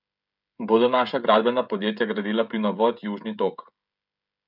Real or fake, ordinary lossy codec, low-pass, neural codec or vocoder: fake; none; 5.4 kHz; codec, 16 kHz, 16 kbps, FreqCodec, smaller model